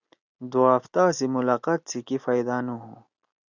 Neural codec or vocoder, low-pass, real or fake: none; 7.2 kHz; real